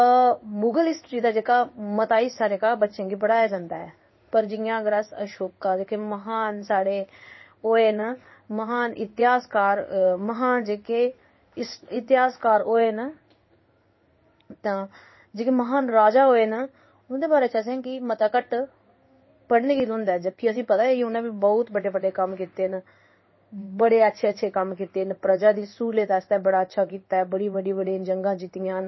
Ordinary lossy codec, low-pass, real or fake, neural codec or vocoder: MP3, 24 kbps; 7.2 kHz; fake; codec, 16 kHz in and 24 kHz out, 1 kbps, XY-Tokenizer